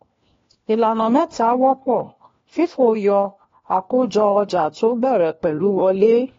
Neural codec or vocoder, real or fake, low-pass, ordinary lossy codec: codec, 16 kHz, 1 kbps, FunCodec, trained on LibriTTS, 50 frames a second; fake; 7.2 kHz; AAC, 32 kbps